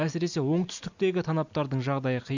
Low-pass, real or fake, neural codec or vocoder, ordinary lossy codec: 7.2 kHz; real; none; none